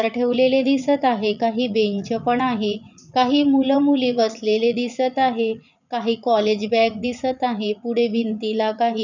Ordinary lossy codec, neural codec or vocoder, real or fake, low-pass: none; vocoder, 44.1 kHz, 80 mel bands, Vocos; fake; 7.2 kHz